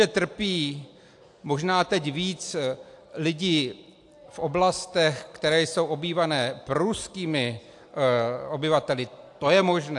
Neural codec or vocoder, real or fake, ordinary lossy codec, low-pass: none; real; AAC, 64 kbps; 10.8 kHz